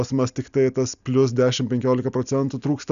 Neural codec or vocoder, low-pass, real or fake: none; 7.2 kHz; real